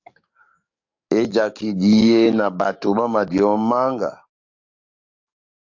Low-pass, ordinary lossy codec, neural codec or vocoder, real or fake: 7.2 kHz; AAC, 48 kbps; codec, 44.1 kHz, 7.8 kbps, DAC; fake